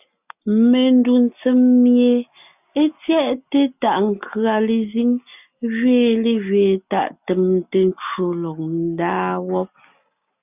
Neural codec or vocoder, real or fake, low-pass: none; real; 3.6 kHz